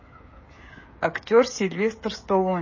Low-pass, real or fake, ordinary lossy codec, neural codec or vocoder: 7.2 kHz; fake; MP3, 32 kbps; codec, 16 kHz, 16 kbps, FreqCodec, smaller model